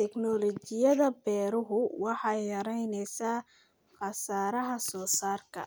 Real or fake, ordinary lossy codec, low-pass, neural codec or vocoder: real; none; none; none